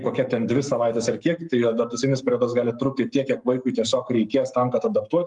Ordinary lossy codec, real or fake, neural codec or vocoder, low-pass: Opus, 32 kbps; fake; codec, 44.1 kHz, 7.8 kbps, Pupu-Codec; 10.8 kHz